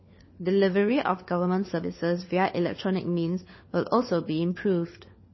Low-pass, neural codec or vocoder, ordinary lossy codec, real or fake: 7.2 kHz; codec, 16 kHz, 4 kbps, FunCodec, trained on LibriTTS, 50 frames a second; MP3, 24 kbps; fake